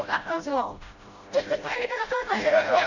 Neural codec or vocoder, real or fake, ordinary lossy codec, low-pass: codec, 16 kHz, 0.5 kbps, FreqCodec, smaller model; fake; none; 7.2 kHz